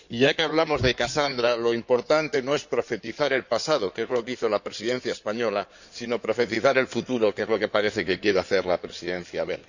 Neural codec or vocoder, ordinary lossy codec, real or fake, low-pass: codec, 16 kHz in and 24 kHz out, 2.2 kbps, FireRedTTS-2 codec; none; fake; 7.2 kHz